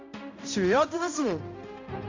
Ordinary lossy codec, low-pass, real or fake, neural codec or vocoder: AAC, 48 kbps; 7.2 kHz; fake; codec, 16 kHz, 0.5 kbps, X-Codec, HuBERT features, trained on balanced general audio